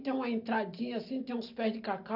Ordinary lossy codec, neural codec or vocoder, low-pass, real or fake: none; none; 5.4 kHz; real